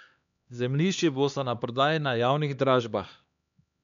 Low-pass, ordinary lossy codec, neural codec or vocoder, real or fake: 7.2 kHz; none; codec, 16 kHz, 2 kbps, X-Codec, HuBERT features, trained on LibriSpeech; fake